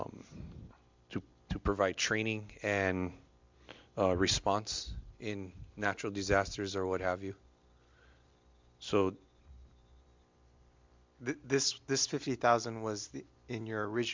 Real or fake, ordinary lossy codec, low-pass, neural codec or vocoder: real; MP3, 64 kbps; 7.2 kHz; none